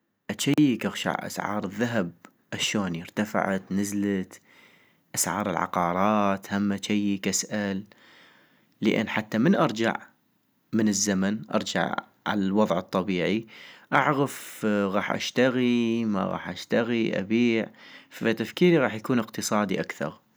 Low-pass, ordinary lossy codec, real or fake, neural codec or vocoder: none; none; real; none